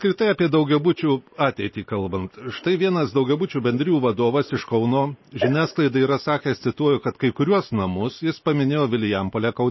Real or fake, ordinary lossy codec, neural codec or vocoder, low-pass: real; MP3, 24 kbps; none; 7.2 kHz